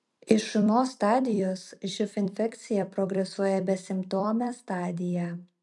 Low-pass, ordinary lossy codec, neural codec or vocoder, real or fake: 10.8 kHz; MP3, 96 kbps; vocoder, 44.1 kHz, 128 mel bands every 256 samples, BigVGAN v2; fake